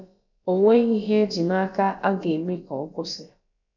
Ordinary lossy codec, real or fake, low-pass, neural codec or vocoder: none; fake; 7.2 kHz; codec, 16 kHz, about 1 kbps, DyCAST, with the encoder's durations